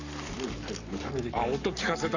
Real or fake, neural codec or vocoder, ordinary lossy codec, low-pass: fake; codec, 44.1 kHz, 7.8 kbps, Pupu-Codec; none; 7.2 kHz